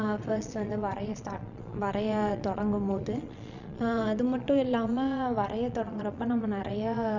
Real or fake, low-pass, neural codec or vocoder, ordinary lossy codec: fake; 7.2 kHz; vocoder, 22.05 kHz, 80 mel bands, Vocos; none